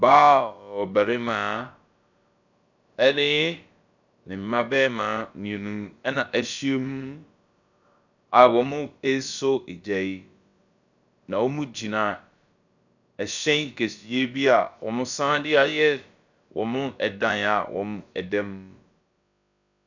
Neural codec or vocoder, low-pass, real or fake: codec, 16 kHz, about 1 kbps, DyCAST, with the encoder's durations; 7.2 kHz; fake